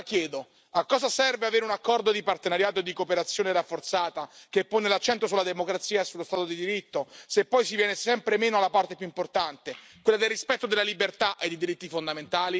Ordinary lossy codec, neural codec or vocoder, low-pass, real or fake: none; none; none; real